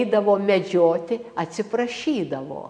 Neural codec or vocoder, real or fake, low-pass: none; real; 9.9 kHz